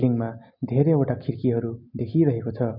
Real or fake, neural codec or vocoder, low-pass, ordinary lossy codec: real; none; 5.4 kHz; none